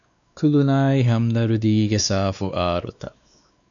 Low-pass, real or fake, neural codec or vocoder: 7.2 kHz; fake; codec, 16 kHz, 2 kbps, X-Codec, WavLM features, trained on Multilingual LibriSpeech